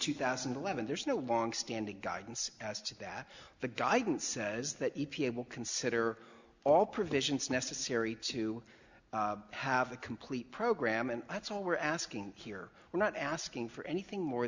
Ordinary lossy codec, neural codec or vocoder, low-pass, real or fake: Opus, 64 kbps; none; 7.2 kHz; real